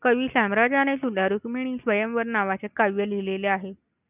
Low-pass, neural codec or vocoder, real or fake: 3.6 kHz; none; real